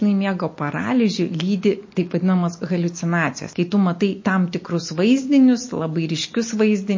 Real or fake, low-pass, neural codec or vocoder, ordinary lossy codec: real; 7.2 kHz; none; MP3, 32 kbps